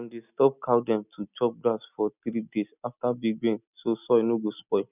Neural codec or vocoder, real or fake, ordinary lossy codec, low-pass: none; real; none; 3.6 kHz